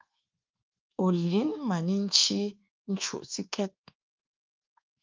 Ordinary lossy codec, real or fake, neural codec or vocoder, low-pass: Opus, 32 kbps; fake; codec, 24 kHz, 1.2 kbps, DualCodec; 7.2 kHz